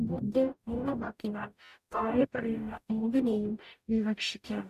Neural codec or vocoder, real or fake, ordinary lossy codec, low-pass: codec, 44.1 kHz, 0.9 kbps, DAC; fake; none; 14.4 kHz